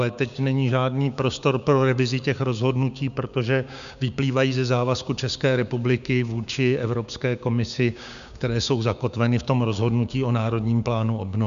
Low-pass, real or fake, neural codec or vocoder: 7.2 kHz; fake; codec, 16 kHz, 6 kbps, DAC